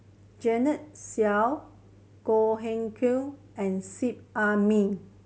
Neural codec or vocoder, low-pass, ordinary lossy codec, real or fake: none; none; none; real